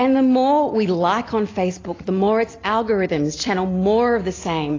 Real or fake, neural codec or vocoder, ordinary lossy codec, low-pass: real; none; AAC, 32 kbps; 7.2 kHz